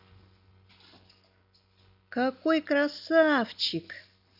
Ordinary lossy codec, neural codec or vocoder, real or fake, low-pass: none; none; real; 5.4 kHz